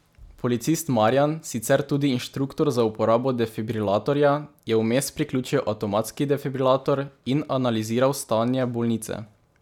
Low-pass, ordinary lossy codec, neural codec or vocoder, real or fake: 19.8 kHz; none; none; real